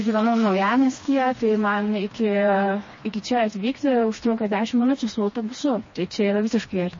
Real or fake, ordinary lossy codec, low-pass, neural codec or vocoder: fake; MP3, 32 kbps; 7.2 kHz; codec, 16 kHz, 2 kbps, FreqCodec, smaller model